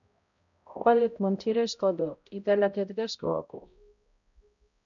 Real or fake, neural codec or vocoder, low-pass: fake; codec, 16 kHz, 0.5 kbps, X-Codec, HuBERT features, trained on balanced general audio; 7.2 kHz